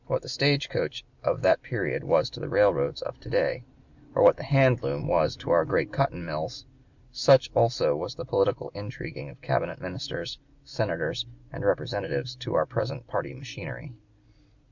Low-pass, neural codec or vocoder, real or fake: 7.2 kHz; none; real